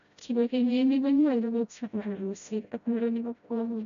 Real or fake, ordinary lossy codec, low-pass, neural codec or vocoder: fake; none; 7.2 kHz; codec, 16 kHz, 0.5 kbps, FreqCodec, smaller model